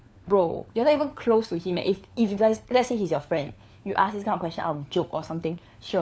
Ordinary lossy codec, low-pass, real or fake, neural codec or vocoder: none; none; fake; codec, 16 kHz, 4 kbps, FunCodec, trained on LibriTTS, 50 frames a second